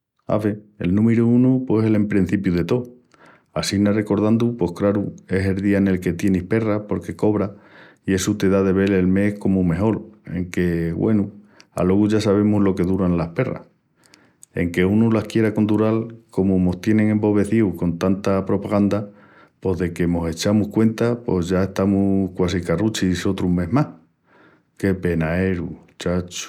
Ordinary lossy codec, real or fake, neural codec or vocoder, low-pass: none; real; none; 19.8 kHz